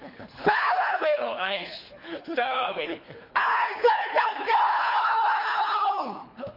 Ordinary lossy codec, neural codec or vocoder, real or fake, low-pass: MP3, 32 kbps; codec, 24 kHz, 3 kbps, HILCodec; fake; 5.4 kHz